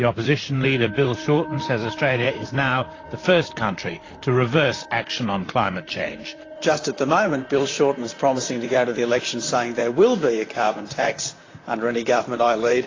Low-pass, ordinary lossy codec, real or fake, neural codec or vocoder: 7.2 kHz; AAC, 32 kbps; fake; vocoder, 44.1 kHz, 128 mel bands, Pupu-Vocoder